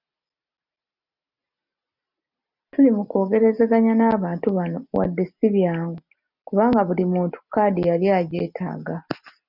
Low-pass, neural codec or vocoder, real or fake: 5.4 kHz; none; real